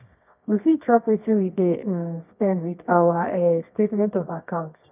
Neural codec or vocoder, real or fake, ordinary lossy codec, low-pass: codec, 24 kHz, 0.9 kbps, WavTokenizer, medium music audio release; fake; none; 3.6 kHz